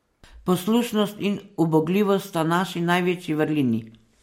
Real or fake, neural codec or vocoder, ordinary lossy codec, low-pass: real; none; MP3, 64 kbps; 19.8 kHz